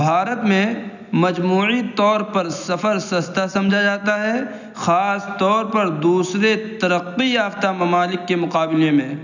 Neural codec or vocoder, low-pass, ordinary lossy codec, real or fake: none; 7.2 kHz; none; real